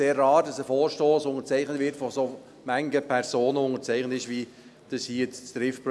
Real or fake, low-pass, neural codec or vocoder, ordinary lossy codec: real; none; none; none